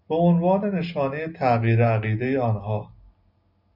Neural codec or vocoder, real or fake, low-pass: none; real; 5.4 kHz